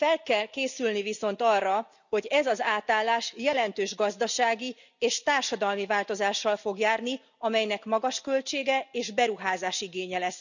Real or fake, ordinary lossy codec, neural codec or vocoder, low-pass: real; none; none; 7.2 kHz